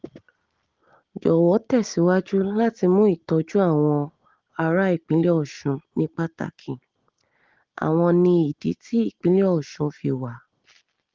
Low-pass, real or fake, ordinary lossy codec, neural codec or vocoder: 7.2 kHz; real; Opus, 16 kbps; none